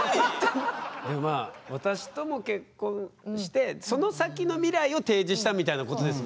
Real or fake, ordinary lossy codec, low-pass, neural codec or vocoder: real; none; none; none